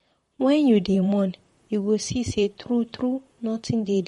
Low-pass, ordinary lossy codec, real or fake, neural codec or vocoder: 19.8 kHz; MP3, 48 kbps; fake; vocoder, 44.1 kHz, 128 mel bands, Pupu-Vocoder